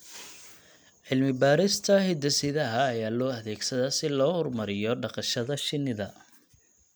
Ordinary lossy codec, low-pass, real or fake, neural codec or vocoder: none; none; real; none